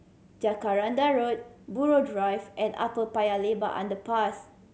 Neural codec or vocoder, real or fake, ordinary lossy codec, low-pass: none; real; none; none